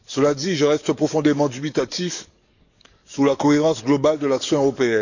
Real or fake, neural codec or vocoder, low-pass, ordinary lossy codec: fake; codec, 44.1 kHz, 7.8 kbps, DAC; 7.2 kHz; none